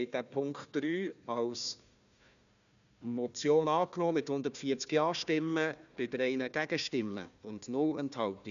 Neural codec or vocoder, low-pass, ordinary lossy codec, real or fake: codec, 16 kHz, 1 kbps, FunCodec, trained on Chinese and English, 50 frames a second; 7.2 kHz; none; fake